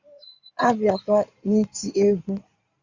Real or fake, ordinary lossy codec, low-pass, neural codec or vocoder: fake; Opus, 64 kbps; 7.2 kHz; codec, 16 kHz in and 24 kHz out, 2.2 kbps, FireRedTTS-2 codec